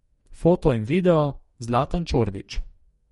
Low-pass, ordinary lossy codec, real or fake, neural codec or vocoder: 19.8 kHz; MP3, 48 kbps; fake; codec, 44.1 kHz, 2.6 kbps, DAC